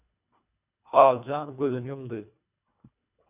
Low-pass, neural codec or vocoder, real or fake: 3.6 kHz; codec, 24 kHz, 1.5 kbps, HILCodec; fake